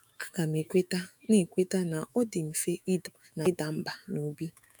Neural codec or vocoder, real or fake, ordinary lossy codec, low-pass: autoencoder, 48 kHz, 128 numbers a frame, DAC-VAE, trained on Japanese speech; fake; none; none